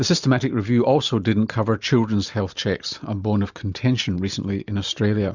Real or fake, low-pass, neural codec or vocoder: real; 7.2 kHz; none